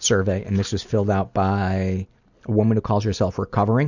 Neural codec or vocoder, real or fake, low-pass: none; real; 7.2 kHz